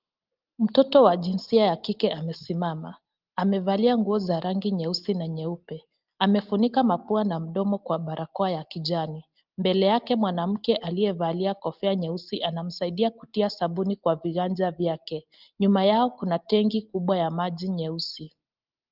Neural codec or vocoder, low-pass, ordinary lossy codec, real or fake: none; 5.4 kHz; Opus, 32 kbps; real